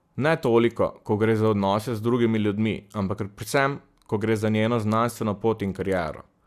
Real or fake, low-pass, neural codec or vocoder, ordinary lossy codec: real; 14.4 kHz; none; Opus, 64 kbps